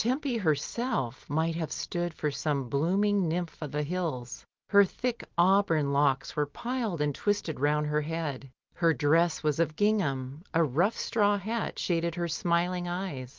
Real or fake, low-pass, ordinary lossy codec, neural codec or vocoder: real; 7.2 kHz; Opus, 32 kbps; none